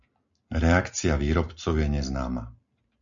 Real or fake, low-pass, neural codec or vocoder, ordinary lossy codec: real; 7.2 kHz; none; MP3, 64 kbps